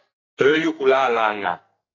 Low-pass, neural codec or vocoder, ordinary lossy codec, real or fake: 7.2 kHz; codec, 44.1 kHz, 2.6 kbps, SNAC; AAC, 32 kbps; fake